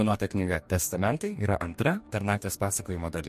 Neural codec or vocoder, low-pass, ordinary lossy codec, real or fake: codec, 44.1 kHz, 2.6 kbps, DAC; 14.4 kHz; MP3, 64 kbps; fake